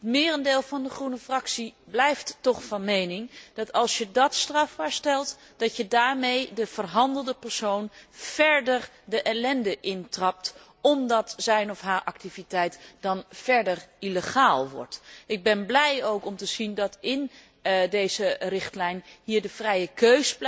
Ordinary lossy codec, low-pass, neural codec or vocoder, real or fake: none; none; none; real